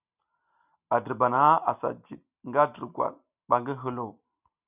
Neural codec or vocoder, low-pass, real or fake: none; 3.6 kHz; real